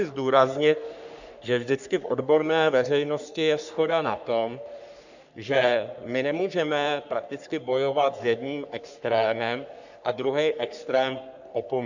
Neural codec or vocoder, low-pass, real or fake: codec, 44.1 kHz, 3.4 kbps, Pupu-Codec; 7.2 kHz; fake